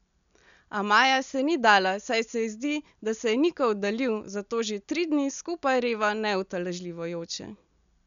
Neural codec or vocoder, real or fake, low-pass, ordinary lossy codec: none; real; 7.2 kHz; none